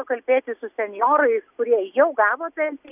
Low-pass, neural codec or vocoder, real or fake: 3.6 kHz; none; real